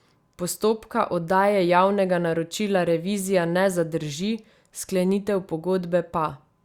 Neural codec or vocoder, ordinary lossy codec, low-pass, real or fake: none; Opus, 64 kbps; 19.8 kHz; real